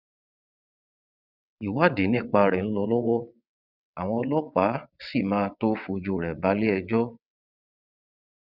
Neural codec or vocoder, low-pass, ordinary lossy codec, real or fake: vocoder, 22.05 kHz, 80 mel bands, WaveNeXt; 5.4 kHz; none; fake